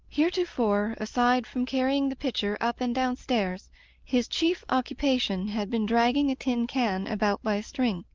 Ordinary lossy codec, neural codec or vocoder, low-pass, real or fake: Opus, 32 kbps; none; 7.2 kHz; real